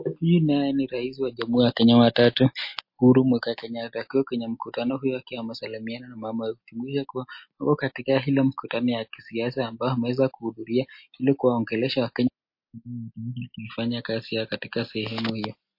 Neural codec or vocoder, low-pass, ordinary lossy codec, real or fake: none; 5.4 kHz; MP3, 32 kbps; real